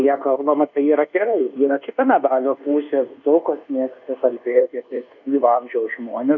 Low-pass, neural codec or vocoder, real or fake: 7.2 kHz; codec, 24 kHz, 1.2 kbps, DualCodec; fake